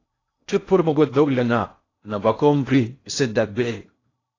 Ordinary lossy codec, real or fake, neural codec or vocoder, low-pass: AAC, 32 kbps; fake; codec, 16 kHz in and 24 kHz out, 0.6 kbps, FocalCodec, streaming, 2048 codes; 7.2 kHz